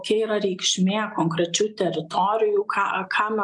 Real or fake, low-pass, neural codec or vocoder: real; 10.8 kHz; none